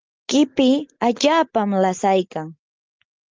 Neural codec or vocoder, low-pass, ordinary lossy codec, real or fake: none; 7.2 kHz; Opus, 32 kbps; real